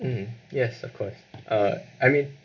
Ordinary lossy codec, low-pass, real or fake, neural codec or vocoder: none; 7.2 kHz; real; none